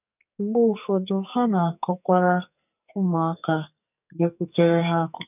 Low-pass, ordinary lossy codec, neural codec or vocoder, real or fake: 3.6 kHz; none; codec, 44.1 kHz, 2.6 kbps, SNAC; fake